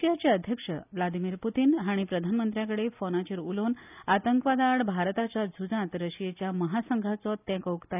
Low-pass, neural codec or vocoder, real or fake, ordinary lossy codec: 3.6 kHz; none; real; none